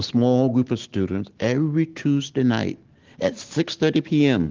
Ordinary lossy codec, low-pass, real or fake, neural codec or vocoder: Opus, 16 kbps; 7.2 kHz; real; none